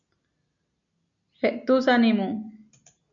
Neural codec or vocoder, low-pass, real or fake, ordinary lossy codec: none; 7.2 kHz; real; MP3, 64 kbps